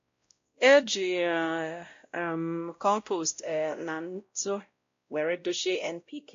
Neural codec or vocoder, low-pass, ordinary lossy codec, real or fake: codec, 16 kHz, 0.5 kbps, X-Codec, WavLM features, trained on Multilingual LibriSpeech; 7.2 kHz; AAC, 48 kbps; fake